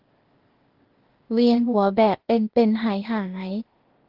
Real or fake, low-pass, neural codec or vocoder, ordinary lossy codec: fake; 5.4 kHz; codec, 16 kHz, 0.8 kbps, ZipCodec; Opus, 16 kbps